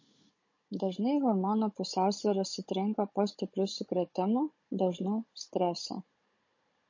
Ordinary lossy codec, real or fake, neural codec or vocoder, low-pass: MP3, 32 kbps; fake; codec, 16 kHz, 16 kbps, FunCodec, trained on Chinese and English, 50 frames a second; 7.2 kHz